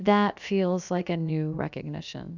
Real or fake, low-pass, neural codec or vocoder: fake; 7.2 kHz; codec, 16 kHz, about 1 kbps, DyCAST, with the encoder's durations